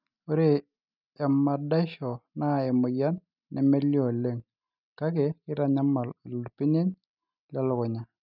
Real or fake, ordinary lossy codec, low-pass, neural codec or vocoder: real; none; 5.4 kHz; none